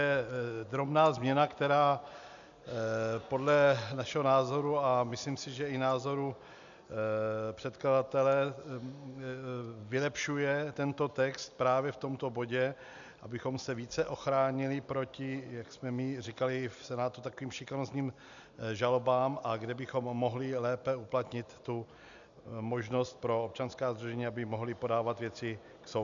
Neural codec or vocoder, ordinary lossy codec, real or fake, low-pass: none; MP3, 96 kbps; real; 7.2 kHz